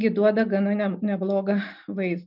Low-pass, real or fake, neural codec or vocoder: 5.4 kHz; real; none